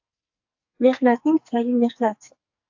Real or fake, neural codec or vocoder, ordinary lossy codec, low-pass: fake; codec, 44.1 kHz, 2.6 kbps, SNAC; AAC, 48 kbps; 7.2 kHz